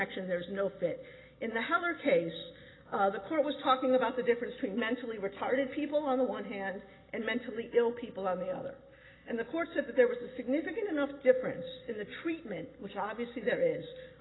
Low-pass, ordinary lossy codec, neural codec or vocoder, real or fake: 7.2 kHz; AAC, 16 kbps; none; real